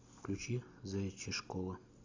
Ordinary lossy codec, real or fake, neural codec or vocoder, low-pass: AAC, 48 kbps; real; none; 7.2 kHz